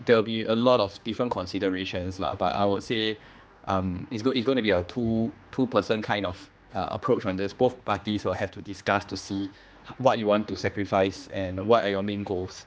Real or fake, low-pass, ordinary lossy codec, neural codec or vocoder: fake; none; none; codec, 16 kHz, 2 kbps, X-Codec, HuBERT features, trained on general audio